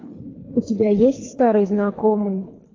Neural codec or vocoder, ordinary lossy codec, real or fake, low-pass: codec, 24 kHz, 3 kbps, HILCodec; AAC, 32 kbps; fake; 7.2 kHz